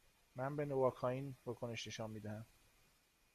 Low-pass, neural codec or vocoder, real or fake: 14.4 kHz; none; real